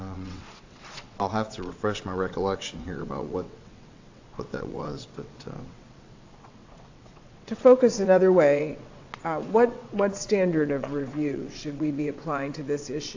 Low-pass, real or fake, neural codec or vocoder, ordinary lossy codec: 7.2 kHz; fake; vocoder, 22.05 kHz, 80 mel bands, WaveNeXt; AAC, 48 kbps